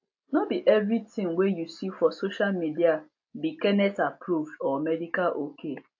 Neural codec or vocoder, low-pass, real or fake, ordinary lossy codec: none; 7.2 kHz; real; AAC, 48 kbps